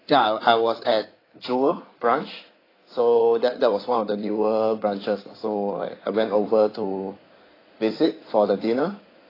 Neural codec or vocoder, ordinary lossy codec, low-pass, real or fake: codec, 16 kHz in and 24 kHz out, 2.2 kbps, FireRedTTS-2 codec; AAC, 24 kbps; 5.4 kHz; fake